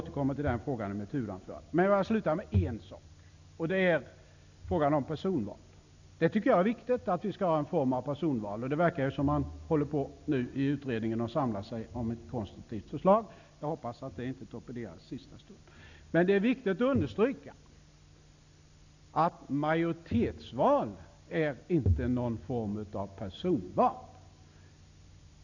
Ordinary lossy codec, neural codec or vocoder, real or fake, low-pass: none; none; real; 7.2 kHz